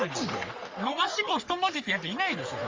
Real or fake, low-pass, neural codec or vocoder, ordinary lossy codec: fake; 7.2 kHz; codec, 44.1 kHz, 3.4 kbps, Pupu-Codec; Opus, 32 kbps